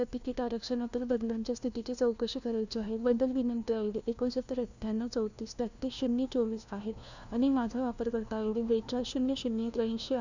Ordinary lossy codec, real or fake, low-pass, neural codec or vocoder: none; fake; 7.2 kHz; codec, 16 kHz, 1 kbps, FunCodec, trained on LibriTTS, 50 frames a second